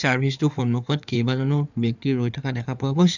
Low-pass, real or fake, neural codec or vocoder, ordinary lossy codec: 7.2 kHz; fake; codec, 16 kHz in and 24 kHz out, 2.2 kbps, FireRedTTS-2 codec; none